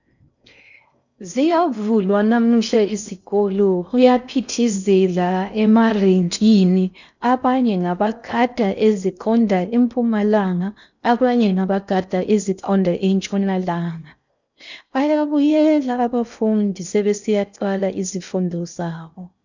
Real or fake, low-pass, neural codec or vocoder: fake; 7.2 kHz; codec, 16 kHz in and 24 kHz out, 0.6 kbps, FocalCodec, streaming, 4096 codes